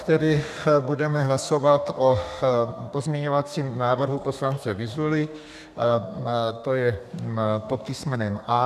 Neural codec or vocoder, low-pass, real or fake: codec, 32 kHz, 1.9 kbps, SNAC; 14.4 kHz; fake